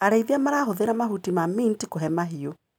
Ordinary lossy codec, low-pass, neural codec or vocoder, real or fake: none; none; none; real